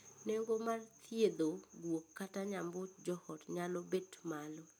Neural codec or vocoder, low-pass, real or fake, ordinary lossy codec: none; none; real; none